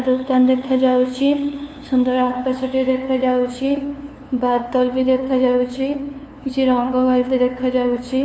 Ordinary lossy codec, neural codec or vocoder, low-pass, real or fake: none; codec, 16 kHz, 2 kbps, FunCodec, trained on LibriTTS, 25 frames a second; none; fake